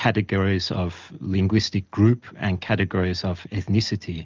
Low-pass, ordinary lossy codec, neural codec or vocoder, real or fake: 7.2 kHz; Opus, 24 kbps; none; real